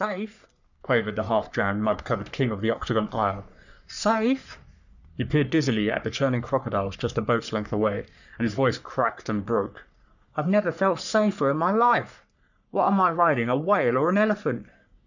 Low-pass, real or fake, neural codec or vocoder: 7.2 kHz; fake; codec, 44.1 kHz, 3.4 kbps, Pupu-Codec